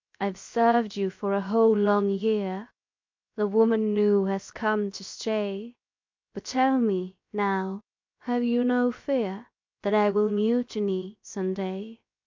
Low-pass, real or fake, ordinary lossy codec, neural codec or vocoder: 7.2 kHz; fake; MP3, 48 kbps; codec, 16 kHz, 0.7 kbps, FocalCodec